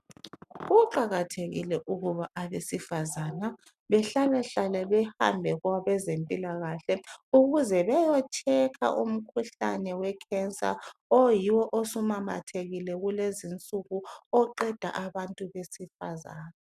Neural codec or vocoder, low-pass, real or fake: none; 14.4 kHz; real